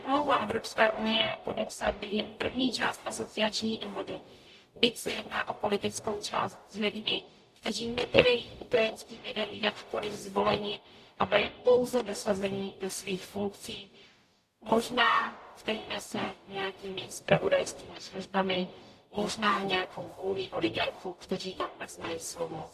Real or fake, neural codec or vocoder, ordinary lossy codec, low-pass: fake; codec, 44.1 kHz, 0.9 kbps, DAC; AAC, 64 kbps; 14.4 kHz